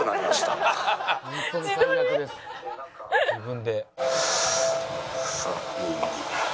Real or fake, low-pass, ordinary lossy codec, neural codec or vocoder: real; none; none; none